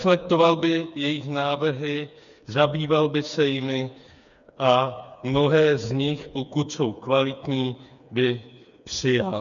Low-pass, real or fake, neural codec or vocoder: 7.2 kHz; fake; codec, 16 kHz, 4 kbps, FreqCodec, smaller model